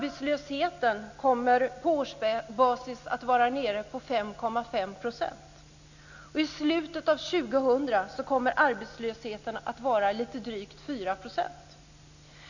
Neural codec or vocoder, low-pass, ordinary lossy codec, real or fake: none; 7.2 kHz; none; real